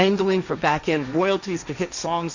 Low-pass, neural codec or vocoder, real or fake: 7.2 kHz; codec, 16 kHz, 1.1 kbps, Voila-Tokenizer; fake